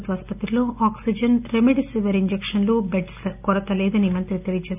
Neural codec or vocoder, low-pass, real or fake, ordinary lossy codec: none; 3.6 kHz; real; none